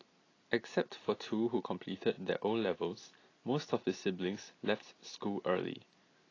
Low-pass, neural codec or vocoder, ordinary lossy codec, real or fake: 7.2 kHz; none; AAC, 32 kbps; real